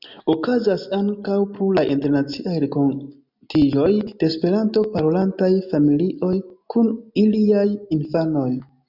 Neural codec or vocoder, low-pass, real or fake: none; 5.4 kHz; real